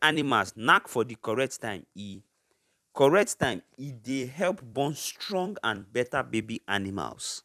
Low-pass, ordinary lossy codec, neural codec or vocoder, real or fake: 14.4 kHz; none; vocoder, 44.1 kHz, 128 mel bands every 256 samples, BigVGAN v2; fake